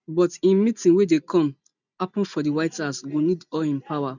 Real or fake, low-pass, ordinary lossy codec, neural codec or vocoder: real; 7.2 kHz; none; none